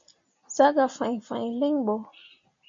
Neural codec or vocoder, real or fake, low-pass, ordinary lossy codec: none; real; 7.2 kHz; MP3, 48 kbps